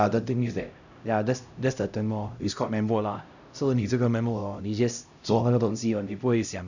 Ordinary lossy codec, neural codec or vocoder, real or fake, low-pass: none; codec, 16 kHz, 0.5 kbps, X-Codec, HuBERT features, trained on LibriSpeech; fake; 7.2 kHz